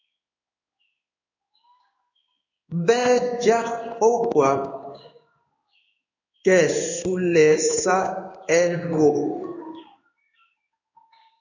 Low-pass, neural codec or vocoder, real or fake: 7.2 kHz; codec, 16 kHz in and 24 kHz out, 1 kbps, XY-Tokenizer; fake